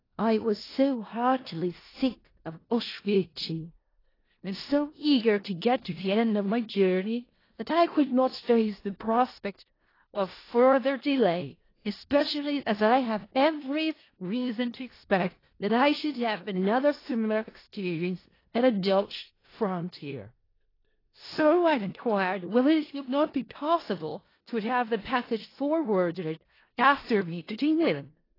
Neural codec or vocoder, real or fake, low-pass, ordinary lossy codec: codec, 16 kHz in and 24 kHz out, 0.4 kbps, LongCat-Audio-Codec, four codebook decoder; fake; 5.4 kHz; AAC, 24 kbps